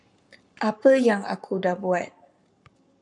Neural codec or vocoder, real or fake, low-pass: codec, 44.1 kHz, 7.8 kbps, Pupu-Codec; fake; 10.8 kHz